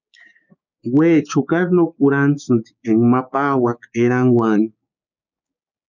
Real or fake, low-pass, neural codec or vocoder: fake; 7.2 kHz; codec, 16 kHz, 6 kbps, DAC